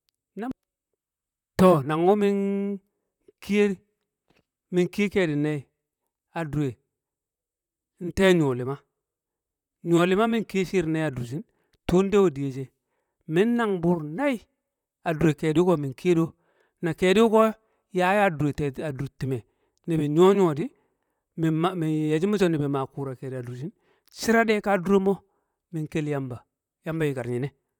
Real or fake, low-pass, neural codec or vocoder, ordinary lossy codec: fake; 19.8 kHz; vocoder, 44.1 kHz, 128 mel bands, Pupu-Vocoder; none